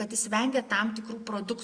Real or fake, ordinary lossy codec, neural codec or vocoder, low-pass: real; Opus, 64 kbps; none; 9.9 kHz